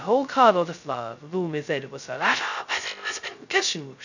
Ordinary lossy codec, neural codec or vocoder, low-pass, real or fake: none; codec, 16 kHz, 0.2 kbps, FocalCodec; 7.2 kHz; fake